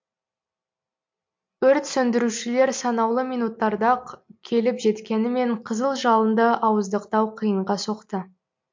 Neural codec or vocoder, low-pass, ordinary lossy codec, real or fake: none; 7.2 kHz; MP3, 48 kbps; real